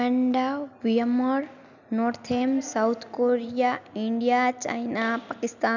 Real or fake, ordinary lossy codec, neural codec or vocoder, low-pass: real; none; none; 7.2 kHz